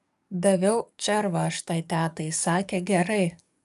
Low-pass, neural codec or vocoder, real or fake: 14.4 kHz; codec, 44.1 kHz, 7.8 kbps, DAC; fake